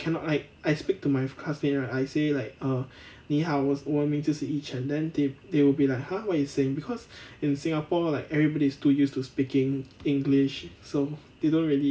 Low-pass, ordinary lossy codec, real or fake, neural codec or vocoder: none; none; real; none